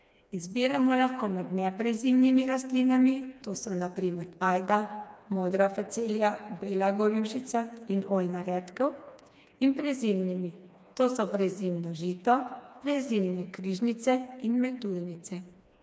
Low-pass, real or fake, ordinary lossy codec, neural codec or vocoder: none; fake; none; codec, 16 kHz, 2 kbps, FreqCodec, smaller model